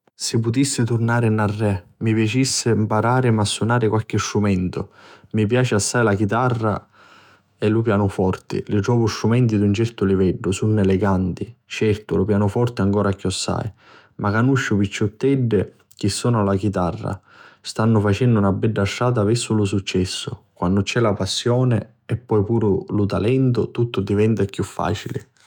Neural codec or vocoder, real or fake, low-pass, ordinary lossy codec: autoencoder, 48 kHz, 128 numbers a frame, DAC-VAE, trained on Japanese speech; fake; 19.8 kHz; none